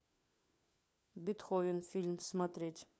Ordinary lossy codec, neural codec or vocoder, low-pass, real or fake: none; codec, 16 kHz, 4 kbps, FunCodec, trained on LibriTTS, 50 frames a second; none; fake